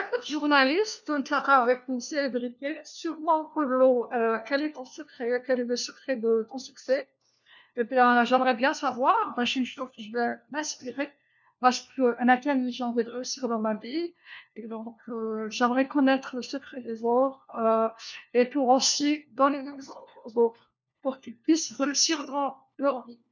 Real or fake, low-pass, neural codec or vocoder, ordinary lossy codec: fake; 7.2 kHz; codec, 16 kHz, 1 kbps, FunCodec, trained on LibriTTS, 50 frames a second; none